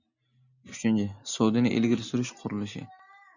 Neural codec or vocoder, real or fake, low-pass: none; real; 7.2 kHz